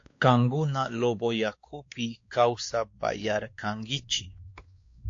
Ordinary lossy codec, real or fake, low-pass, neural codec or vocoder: AAC, 48 kbps; fake; 7.2 kHz; codec, 16 kHz, 2 kbps, X-Codec, WavLM features, trained on Multilingual LibriSpeech